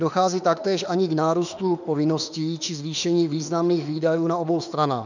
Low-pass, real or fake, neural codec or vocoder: 7.2 kHz; fake; autoencoder, 48 kHz, 32 numbers a frame, DAC-VAE, trained on Japanese speech